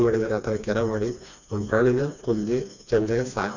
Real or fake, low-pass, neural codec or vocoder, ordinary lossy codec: fake; 7.2 kHz; codec, 16 kHz, 2 kbps, FreqCodec, smaller model; none